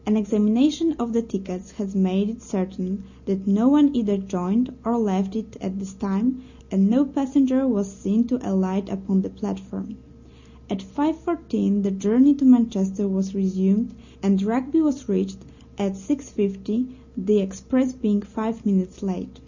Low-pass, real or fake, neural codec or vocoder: 7.2 kHz; real; none